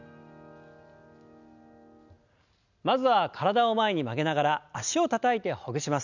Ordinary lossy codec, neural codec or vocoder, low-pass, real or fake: none; none; 7.2 kHz; real